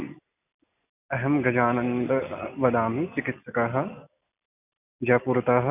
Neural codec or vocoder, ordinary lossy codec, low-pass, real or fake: none; none; 3.6 kHz; real